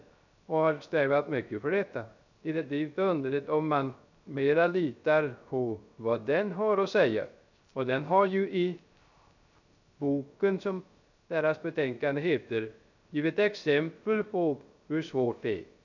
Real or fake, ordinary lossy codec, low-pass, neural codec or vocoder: fake; none; 7.2 kHz; codec, 16 kHz, 0.3 kbps, FocalCodec